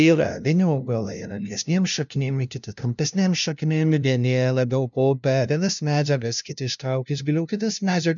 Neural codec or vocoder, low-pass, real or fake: codec, 16 kHz, 0.5 kbps, FunCodec, trained on LibriTTS, 25 frames a second; 7.2 kHz; fake